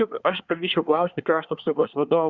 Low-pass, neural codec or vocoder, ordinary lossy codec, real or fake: 7.2 kHz; codec, 24 kHz, 1 kbps, SNAC; Opus, 64 kbps; fake